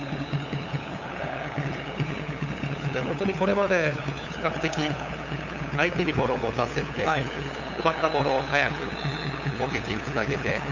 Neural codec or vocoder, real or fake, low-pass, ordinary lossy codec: codec, 16 kHz, 8 kbps, FunCodec, trained on LibriTTS, 25 frames a second; fake; 7.2 kHz; none